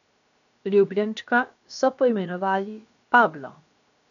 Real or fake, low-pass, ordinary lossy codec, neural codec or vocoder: fake; 7.2 kHz; none; codec, 16 kHz, 0.7 kbps, FocalCodec